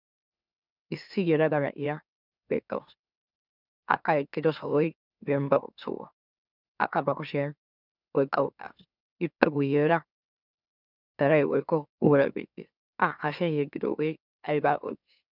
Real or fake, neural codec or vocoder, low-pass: fake; autoencoder, 44.1 kHz, a latent of 192 numbers a frame, MeloTTS; 5.4 kHz